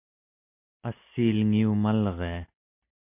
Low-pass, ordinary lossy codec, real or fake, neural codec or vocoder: 3.6 kHz; MP3, 32 kbps; real; none